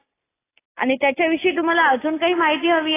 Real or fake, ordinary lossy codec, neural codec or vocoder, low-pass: real; AAC, 16 kbps; none; 3.6 kHz